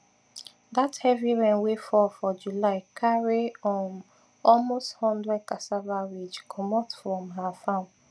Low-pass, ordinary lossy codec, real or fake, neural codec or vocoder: none; none; real; none